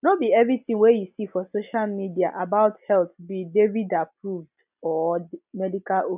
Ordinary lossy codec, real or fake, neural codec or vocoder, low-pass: none; real; none; 3.6 kHz